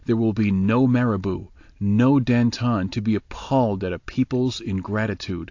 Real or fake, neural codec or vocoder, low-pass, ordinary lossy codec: real; none; 7.2 kHz; AAC, 48 kbps